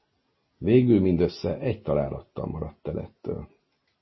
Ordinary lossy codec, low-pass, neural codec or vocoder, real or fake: MP3, 24 kbps; 7.2 kHz; none; real